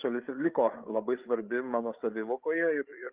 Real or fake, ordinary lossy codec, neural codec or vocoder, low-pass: fake; Opus, 32 kbps; codec, 16 kHz, 8 kbps, FreqCodec, larger model; 3.6 kHz